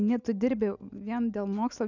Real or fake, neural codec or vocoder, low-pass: real; none; 7.2 kHz